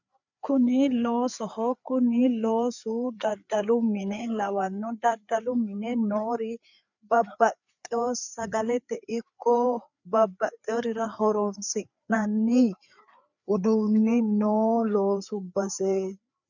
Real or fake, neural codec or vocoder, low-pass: fake; codec, 16 kHz, 4 kbps, FreqCodec, larger model; 7.2 kHz